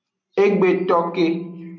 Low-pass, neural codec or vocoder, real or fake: 7.2 kHz; none; real